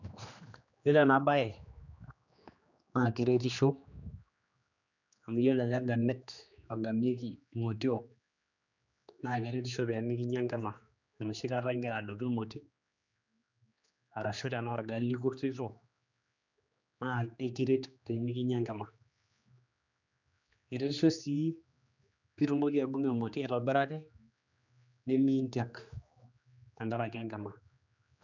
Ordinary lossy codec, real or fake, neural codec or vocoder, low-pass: none; fake; codec, 16 kHz, 2 kbps, X-Codec, HuBERT features, trained on general audio; 7.2 kHz